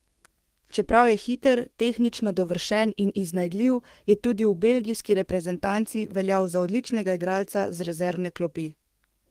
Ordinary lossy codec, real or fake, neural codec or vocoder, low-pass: Opus, 24 kbps; fake; codec, 32 kHz, 1.9 kbps, SNAC; 14.4 kHz